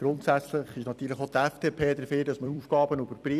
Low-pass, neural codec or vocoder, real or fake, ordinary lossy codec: 14.4 kHz; vocoder, 44.1 kHz, 128 mel bands every 256 samples, BigVGAN v2; fake; none